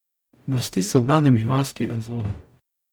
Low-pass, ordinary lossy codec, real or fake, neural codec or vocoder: none; none; fake; codec, 44.1 kHz, 0.9 kbps, DAC